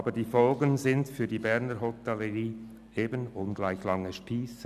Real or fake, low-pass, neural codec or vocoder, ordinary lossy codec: real; 14.4 kHz; none; none